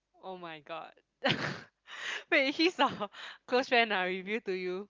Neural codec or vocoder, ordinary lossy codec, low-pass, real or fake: none; Opus, 32 kbps; 7.2 kHz; real